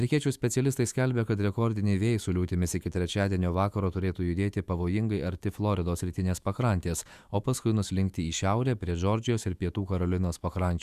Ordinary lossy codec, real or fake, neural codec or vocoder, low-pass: Opus, 64 kbps; fake; autoencoder, 48 kHz, 128 numbers a frame, DAC-VAE, trained on Japanese speech; 14.4 kHz